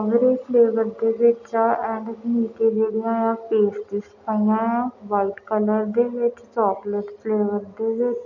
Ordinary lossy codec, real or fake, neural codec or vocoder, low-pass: none; real; none; 7.2 kHz